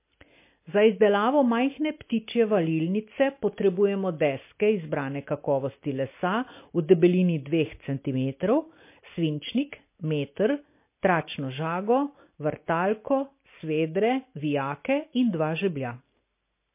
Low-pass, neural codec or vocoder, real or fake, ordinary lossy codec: 3.6 kHz; none; real; MP3, 24 kbps